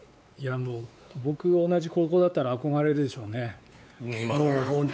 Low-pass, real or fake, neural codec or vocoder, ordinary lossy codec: none; fake; codec, 16 kHz, 4 kbps, X-Codec, WavLM features, trained on Multilingual LibriSpeech; none